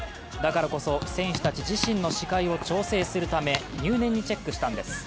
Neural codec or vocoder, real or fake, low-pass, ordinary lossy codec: none; real; none; none